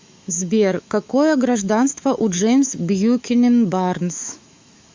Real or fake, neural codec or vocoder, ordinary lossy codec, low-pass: fake; autoencoder, 48 kHz, 128 numbers a frame, DAC-VAE, trained on Japanese speech; MP3, 64 kbps; 7.2 kHz